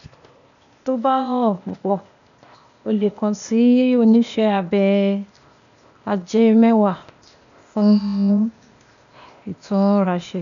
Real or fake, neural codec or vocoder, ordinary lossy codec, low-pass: fake; codec, 16 kHz, 0.8 kbps, ZipCodec; none; 7.2 kHz